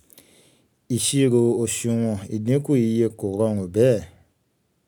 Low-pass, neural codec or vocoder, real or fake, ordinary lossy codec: none; none; real; none